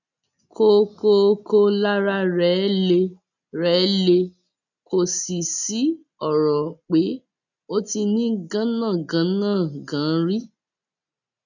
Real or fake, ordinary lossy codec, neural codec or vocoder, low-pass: real; none; none; 7.2 kHz